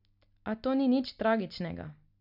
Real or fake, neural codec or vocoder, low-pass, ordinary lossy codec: real; none; 5.4 kHz; none